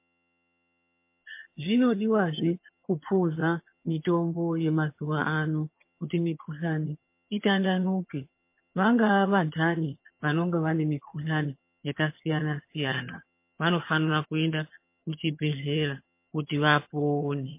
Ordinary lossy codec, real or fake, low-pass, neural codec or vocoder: MP3, 24 kbps; fake; 3.6 kHz; vocoder, 22.05 kHz, 80 mel bands, HiFi-GAN